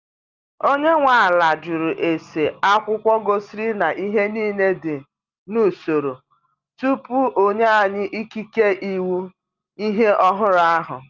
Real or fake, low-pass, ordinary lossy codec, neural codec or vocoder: real; 7.2 kHz; Opus, 24 kbps; none